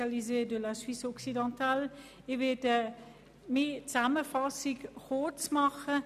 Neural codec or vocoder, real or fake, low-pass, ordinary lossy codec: none; real; 14.4 kHz; none